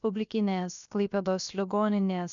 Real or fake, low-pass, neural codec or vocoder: fake; 7.2 kHz; codec, 16 kHz, about 1 kbps, DyCAST, with the encoder's durations